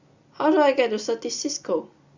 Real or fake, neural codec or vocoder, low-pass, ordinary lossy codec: real; none; 7.2 kHz; Opus, 64 kbps